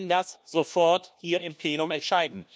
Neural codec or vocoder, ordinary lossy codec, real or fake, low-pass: codec, 16 kHz, 1 kbps, FunCodec, trained on LibriTTS, 50 frames a second; none; fake; none